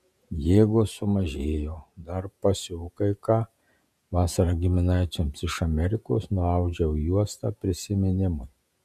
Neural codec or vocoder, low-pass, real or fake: vocoder, 48 kHz, 128 mel bands, Vocos; 14.4 kHz; fake